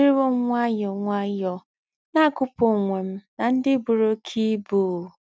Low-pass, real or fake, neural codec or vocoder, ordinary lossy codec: none; real; none; none